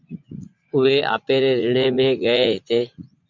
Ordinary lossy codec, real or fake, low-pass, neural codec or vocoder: MP3, 64 kbps; fake; 7.2 kHz; vocoder, 44.1 kHz, 80 mel bands, Vocos